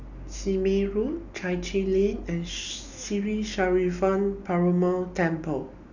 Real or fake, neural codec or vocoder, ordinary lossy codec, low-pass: real; none; none; 7.2 kHz